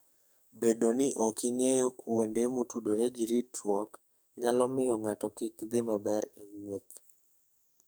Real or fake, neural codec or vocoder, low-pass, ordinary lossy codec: fake; codec, 44.1 kHz, 2.6 kbps, SNAC; none; none